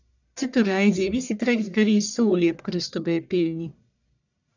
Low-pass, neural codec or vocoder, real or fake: 7.2 kHz; codec, 44.1 kHz, 1.7 kbps, Pupu-Codec; fake